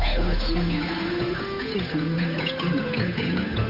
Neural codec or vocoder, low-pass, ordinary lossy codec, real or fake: codec, 16 kHz, 8 kbps, FunCodec, trained on Chinese and English, 25 frames a second; 5.4 kHz; none; fake